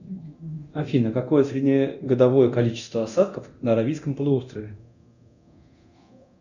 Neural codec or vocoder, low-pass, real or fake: codec, 24 kHz, 0.9 kbps, DualCodec; 7.2 kHz; fake